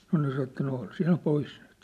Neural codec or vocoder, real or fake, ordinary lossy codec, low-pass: none; real; none; 14.4 kHz